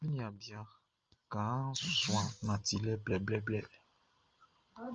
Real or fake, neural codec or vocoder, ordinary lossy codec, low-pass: real; none; Opus, 24 kbps; 7.2 kHz